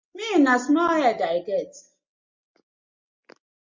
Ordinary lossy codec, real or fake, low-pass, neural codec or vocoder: MP3, 64 kbps; real; 7.2 kHz; none